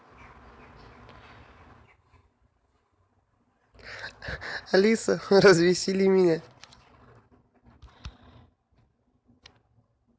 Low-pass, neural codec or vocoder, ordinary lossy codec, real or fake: none; none; none; real